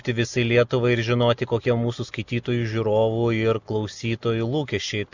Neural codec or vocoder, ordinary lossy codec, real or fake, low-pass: none; Opus, 64 kbps; real; 7.2 kHz